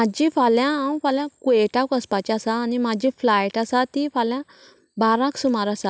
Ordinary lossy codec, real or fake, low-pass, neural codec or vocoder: none; real; none; none